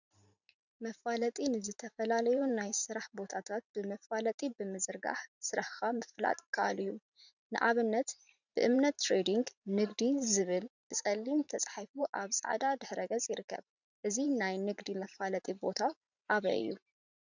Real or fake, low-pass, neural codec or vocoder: fake; 7.2 kHz; vocoder, 44.1 kHz, 128 mel bands every 512 samples, BigVGAN v2